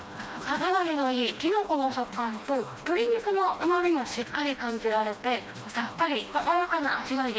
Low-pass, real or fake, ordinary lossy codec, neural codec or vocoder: none; fake; none; codec, 16 kHz, 1 kbps, FreqCodec, smaller model